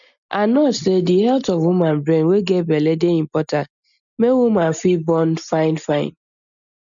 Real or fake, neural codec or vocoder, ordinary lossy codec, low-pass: real; none; none; 7.2 kHz